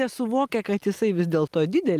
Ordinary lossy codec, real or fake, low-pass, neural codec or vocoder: Opus, 32 kbps; real; 14.4 kHz; none